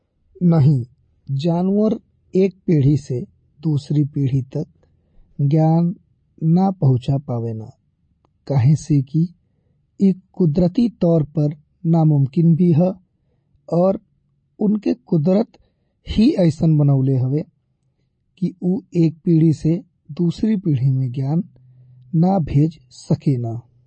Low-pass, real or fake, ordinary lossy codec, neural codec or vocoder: 9.9 kHz; real; MP3, 32 kbps; none